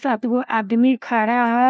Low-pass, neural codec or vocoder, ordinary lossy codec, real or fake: none; codec, 16 kHz, 1 kbps, FunCodec, trained on LibriTTS, 50 frames a second; none; fake